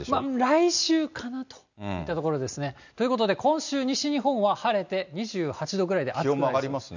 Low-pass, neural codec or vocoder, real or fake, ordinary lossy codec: 7.2 kHz; none; real; none